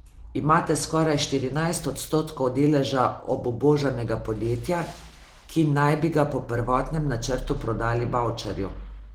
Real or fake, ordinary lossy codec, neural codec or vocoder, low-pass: real; Opus, 16 kbps; none; 19.8 kHz